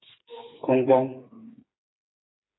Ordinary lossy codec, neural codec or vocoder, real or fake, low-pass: AAC, 16 kbps; codec, 16 kHz, 4 kbps, FreqCodec, smaller model; fake; 7.2 kHz